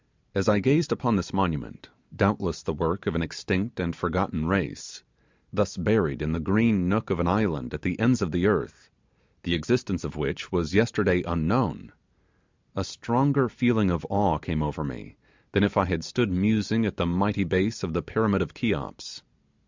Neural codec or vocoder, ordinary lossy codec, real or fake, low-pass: none; MP3, 64 kbps; real; 7.2 kHz